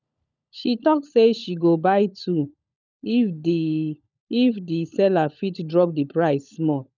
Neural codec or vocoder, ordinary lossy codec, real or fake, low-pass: codec, 16 kHz, 16 kbps, FunCodec, trained on LibriTTS, 50 frames a second; none; fake; 7.2 kHz